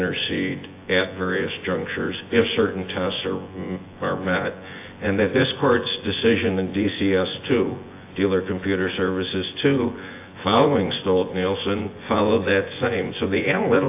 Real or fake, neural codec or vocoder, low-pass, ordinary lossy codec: fake; vocoder, 24 kHz, 100 mel bands, Vocos; 3.6 kHz; AAC, 24 kbps